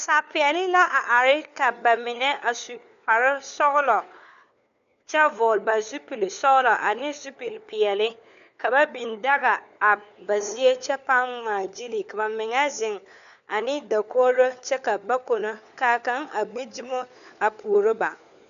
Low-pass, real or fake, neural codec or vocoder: 7.2 kHz; fake; codec, 16 kHz, 4 kbps, FunCodec, trained on LibriTTS, 50 frames a second